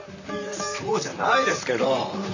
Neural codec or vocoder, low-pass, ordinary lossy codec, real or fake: vocoder, 44.1 kHz, 128 mel bands, Pupu-Vocoder; 7.2 kHz; none; fake